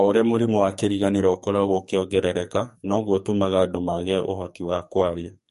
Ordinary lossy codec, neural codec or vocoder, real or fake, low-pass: MP3, 48 kbps; codec, 44.1 kHz, 2.6 kbps, SNAC; fake; 14.4 kHz